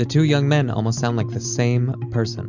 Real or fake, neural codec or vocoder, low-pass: real; none; 7.2 kHz